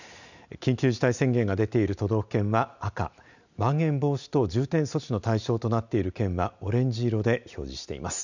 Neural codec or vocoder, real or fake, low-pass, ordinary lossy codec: none; real; 7.2 kHz; none